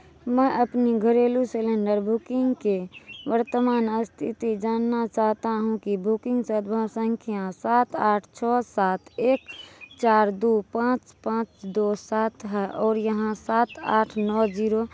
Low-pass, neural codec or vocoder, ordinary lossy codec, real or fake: none; none; none; real